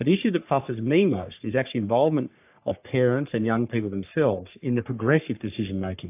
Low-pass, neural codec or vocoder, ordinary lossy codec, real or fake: 3.6 kHz; codec, 44.1 kHz, 3.4 kbps, Pupu-Codec; AAC, 32 kbps; fake